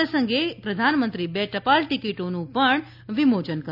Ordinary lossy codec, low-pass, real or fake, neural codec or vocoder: none; 5.4 kHz; real; none